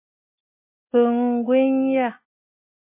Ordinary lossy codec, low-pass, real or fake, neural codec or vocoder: MP3, 24 kbps; 3.6 kHz; real; none